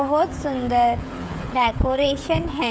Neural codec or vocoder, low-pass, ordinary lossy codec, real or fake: codec, 16 kHz, 16 kbps, FreqCodec, smaller model; none; none; fake